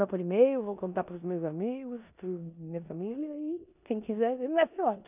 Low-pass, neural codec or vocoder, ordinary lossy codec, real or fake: 3.6 kHz; codec, 16 kHz in and 24 kHz out, 0.9 kbps, LongCat-Audio-Codec, four codebook decoder; none; fake